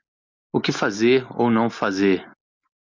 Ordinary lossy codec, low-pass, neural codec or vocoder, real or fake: MP3, 64 kbps; 7.2 kHz; none; real